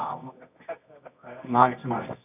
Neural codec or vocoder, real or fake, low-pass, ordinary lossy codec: codec, 24 kHz, 0.9 kbps, WavTokenizer, medium music audio release; fake; 3.6 kHz; none